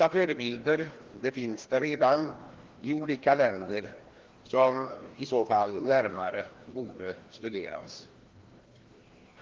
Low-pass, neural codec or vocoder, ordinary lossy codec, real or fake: 7.2 kHz; codec, 16 kHz, 1 kbps, FreqCodec, larger model; Opus, 16 kbps; fake